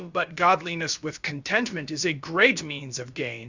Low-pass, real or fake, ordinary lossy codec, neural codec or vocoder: 7.2 kHz; fake; Opus, 64 kbps; codec, 16 kHz, about 1 kbps, DyCAST, with the encoder's durations